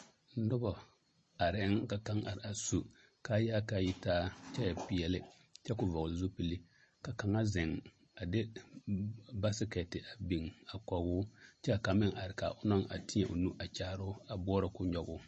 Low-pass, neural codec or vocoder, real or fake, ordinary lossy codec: 10.8 kHz; none; real; MP3, 32 kbps